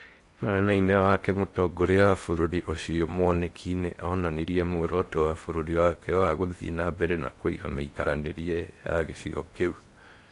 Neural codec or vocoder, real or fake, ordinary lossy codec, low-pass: codec, 16 kHz in and 24 kHz out, 0.8 kbps, FocalCodec, streaming, 65536 codes; fake; AAC, 48 kbps; 10.8 kHz